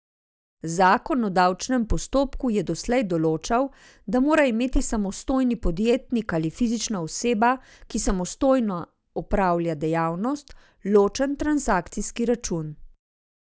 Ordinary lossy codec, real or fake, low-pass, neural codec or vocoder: none; real; none; none